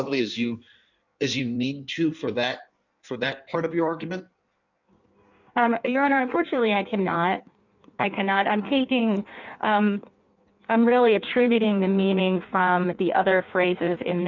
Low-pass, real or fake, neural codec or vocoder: 7.2 kHz; fake; codec, 16 kHz in and 24 kHz out, 1.1 kbps, FireRedTTS-2 codec